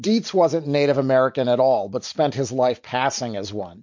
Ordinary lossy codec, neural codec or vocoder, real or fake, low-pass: MP3, 48 kbps; none; real; 7.2 kHz